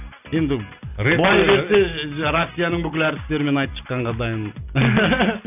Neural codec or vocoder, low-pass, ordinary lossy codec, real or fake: none; 3.6 kHz; none; real